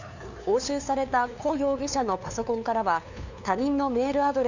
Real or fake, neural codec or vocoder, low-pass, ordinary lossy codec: fake; codec, 16 kHz, 2 kbps, FunCodec, trained on LibriTTS, 25 frames a second; 7.2 kHz; none